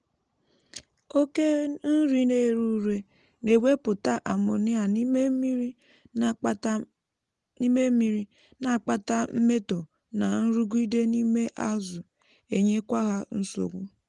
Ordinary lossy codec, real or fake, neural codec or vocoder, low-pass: Opus, 24 kbps; real; none; 10.8 kHz